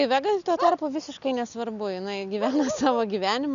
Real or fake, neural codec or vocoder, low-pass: real; none; 7.2 kHz